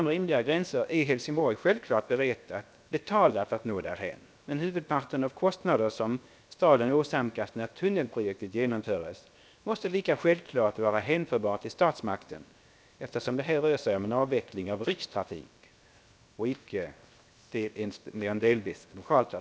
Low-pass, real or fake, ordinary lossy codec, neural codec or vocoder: none; fake; none; codec, 16 kHz, 0.7 kbps, FocalCodec